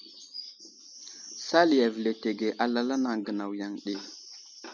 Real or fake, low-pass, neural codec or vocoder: real; 7.2 kHz; none